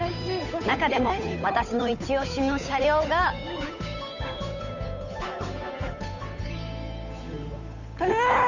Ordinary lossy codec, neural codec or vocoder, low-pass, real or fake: none; codec, 16 kHz, 8 kbps, FunCodec, trained on Chinese and English, 25 frames a second; 7.2 kHz; fake